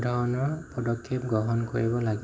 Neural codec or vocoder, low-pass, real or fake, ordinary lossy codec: none; none; real; none